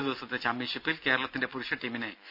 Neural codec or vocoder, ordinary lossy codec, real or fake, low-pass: none; none; real; 5.4 kHz